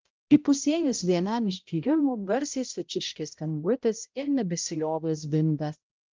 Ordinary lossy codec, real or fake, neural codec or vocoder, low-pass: Opus, 32 kbps; fake; codec, 16 kHz, 0.5 kbps, X-Codec, HuBERT features, trained on balanced general audio; 7.2 kHz